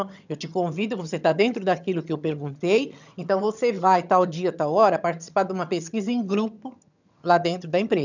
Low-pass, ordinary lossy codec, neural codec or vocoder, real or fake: 7.2 kHz; none; vocoder, 22.05 kHz, 80 mel bands, HiFi-GAN; fake